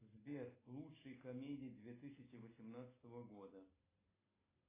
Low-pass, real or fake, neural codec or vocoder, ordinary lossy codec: 3.6 kHz; real; none; AAC, 16 kbps